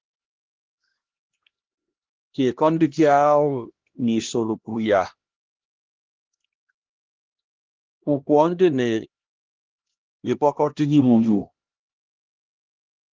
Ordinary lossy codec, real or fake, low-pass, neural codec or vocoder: Opus, 16 kbps; fake; 7.2 kHz; codec, 16 kHz, 1 kbps, X-Codec, HuBERT features, trained on LibriSpeech